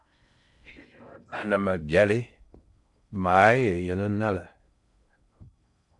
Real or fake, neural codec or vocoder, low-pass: fake; codec, 16 kHz in and 24 kHz out, 0.6 kbps, FocalCodec, streaming, 4096 codes; 10.8 kHz